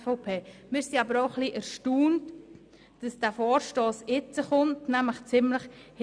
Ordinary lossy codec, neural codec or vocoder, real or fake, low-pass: none; none; real; 9.9 kHz